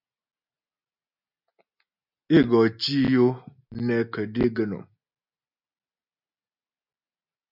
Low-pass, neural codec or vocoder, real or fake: 5.4 kHz; none; real